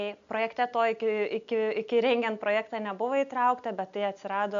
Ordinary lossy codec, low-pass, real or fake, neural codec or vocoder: MP3, 96 kbps; 7.2 kHz; real; none